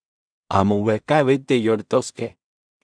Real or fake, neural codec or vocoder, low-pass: fake; codec, 16 kHz in and 24 kHz out, 0.4 kbps, LongCat-Audio-Codec, two codebook decoder; 9.9 kHz